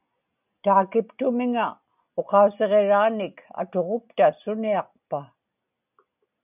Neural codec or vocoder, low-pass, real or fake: none; 3.6 kHz; real